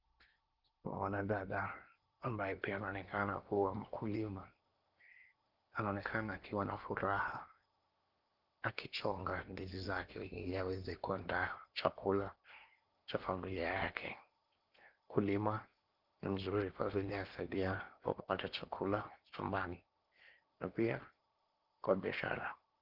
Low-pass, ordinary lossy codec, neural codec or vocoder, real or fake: 5.4 kHz; Opus, 32 kbps; codec, 16 kHz in and 24 kHz out, 0.8 kbps, FocalCodec, streaming, 65536 codes; fake